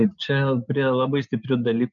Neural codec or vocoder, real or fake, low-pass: none; real; 7.2 kHz